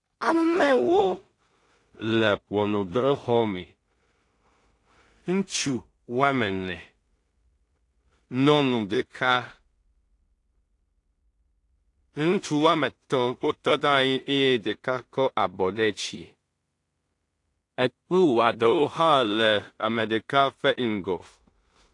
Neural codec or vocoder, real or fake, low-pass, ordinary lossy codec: codec, 16 kHz in and 24 kHz out, 0.4 kbps, LongCat-Audio-Codec, two codebook decoder; fake; 10.8 kHz; AAC, 48 kbps